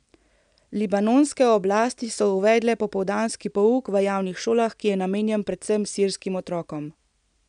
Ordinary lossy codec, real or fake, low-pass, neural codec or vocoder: MP3, 96 kbps; real; 9.9 kHz; none